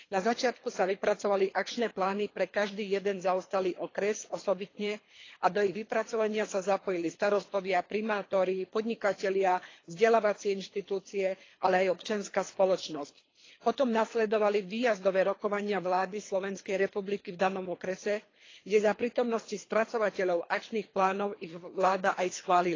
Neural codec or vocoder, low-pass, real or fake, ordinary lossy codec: codec, 24 kHz, 3 kbps, HILCodec; 7.2 kHz; fake; AAC, 32 kbps